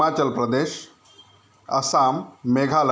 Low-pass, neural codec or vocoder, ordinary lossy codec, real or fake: none; none; none; real